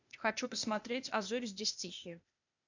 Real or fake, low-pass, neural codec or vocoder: fake; 7.2 kHz; codec, 16 kHz, 0.8 kbps, ZipCodec